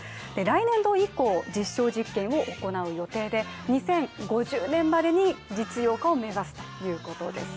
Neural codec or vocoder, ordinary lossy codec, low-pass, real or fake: none; none; none; real